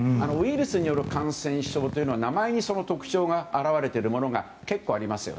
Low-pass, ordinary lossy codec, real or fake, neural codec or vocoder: none; none; real; none